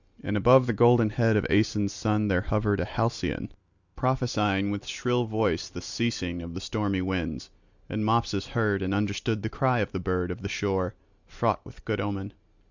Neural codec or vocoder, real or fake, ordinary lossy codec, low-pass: none; real; Opus, 64 kbps; 7.2 kHz